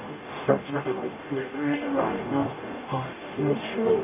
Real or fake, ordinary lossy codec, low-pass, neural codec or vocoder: fake; none; 3.6 kHz; codec, 44.1 kHz, 0.9 kbps, DAC